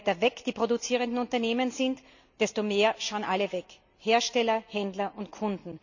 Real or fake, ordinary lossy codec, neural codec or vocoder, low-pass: real; none; none; 7.2 kHz